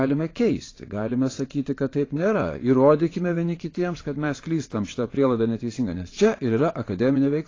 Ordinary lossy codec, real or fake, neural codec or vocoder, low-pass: AAC, 32 kbps; fake; vocoder, 22.05 kHz, 80 mel bands, WaveNeXt; 7.2 kHz